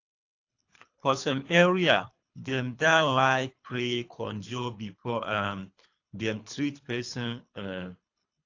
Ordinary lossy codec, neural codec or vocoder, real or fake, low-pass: AAC, 48 kbps; codec, 24 kHz, 3 kbps, HILCodec; fake; 7.2 kHz